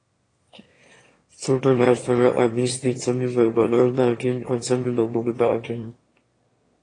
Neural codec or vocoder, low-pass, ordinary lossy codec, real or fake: autoencoder, 22.05 kHz, a latent of 192 numbers a frame, VITS, trained on one speaker; 9.9 kHz; AAC, 32 kbps; fake